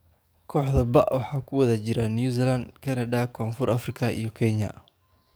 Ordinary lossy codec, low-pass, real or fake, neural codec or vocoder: none; none; fake; codec, 44.1 kHz, 7.8 kbps, DAC